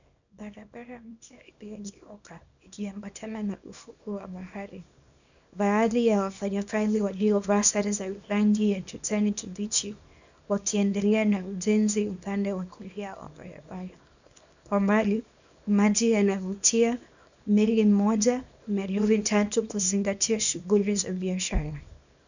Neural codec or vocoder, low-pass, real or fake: codec, 24 kHz, 0.9 kbps, WavTokenizer, small release; 7.2 kHz; fake